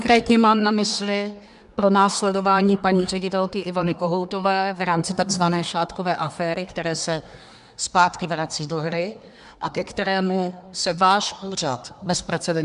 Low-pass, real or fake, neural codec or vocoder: 10.8 kHz; fake; codec, 24 kHz, 1 kbps, SNAC